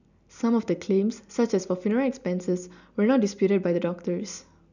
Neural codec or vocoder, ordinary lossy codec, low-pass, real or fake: none; none; 7.2 kHz; real